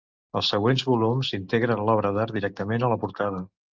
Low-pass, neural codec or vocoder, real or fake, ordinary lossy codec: 7.2 kHz; none; real; Opus, 32 kbps